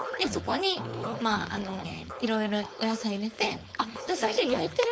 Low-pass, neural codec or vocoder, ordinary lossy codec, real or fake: none; codec, 16 kHz, 4.8 kbps, FACodec; none; fake